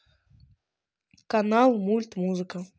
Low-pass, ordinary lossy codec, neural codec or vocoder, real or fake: none; none; none; real